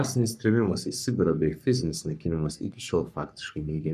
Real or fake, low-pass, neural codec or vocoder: fake; 14.4 kHz; codec, 44.1 kHz, 3.4 kbps, Pupu-Codec